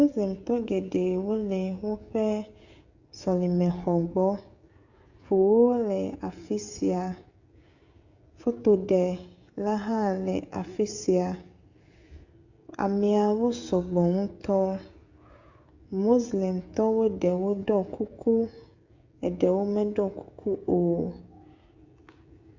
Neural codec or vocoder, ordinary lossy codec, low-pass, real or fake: codec, 16 kHz, 16 kbps, FreqCodec, smaller model; Opus, 64 kbps; 7.2 kHz; fake